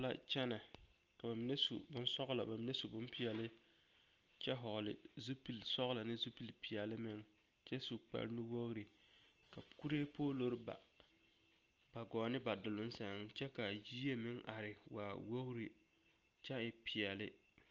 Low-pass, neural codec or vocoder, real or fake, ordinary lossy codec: 7.2 kHz; none; real; Opus, 24 kbps